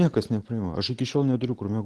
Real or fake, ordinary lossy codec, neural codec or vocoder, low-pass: real; Opus, 16 kbps; none; 10.8 kHz